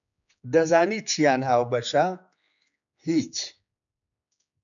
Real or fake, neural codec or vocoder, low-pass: fake; codec, 16 kHz, 4 kbps, X-Codec, HuBERT features, trained on general audio; 7.2 kHz